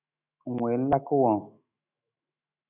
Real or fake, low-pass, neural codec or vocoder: fake; 3.6 kHz; autoencoder, 48 kHz, 128 numbers a frame, DAC-VAE, trained on Japanese speech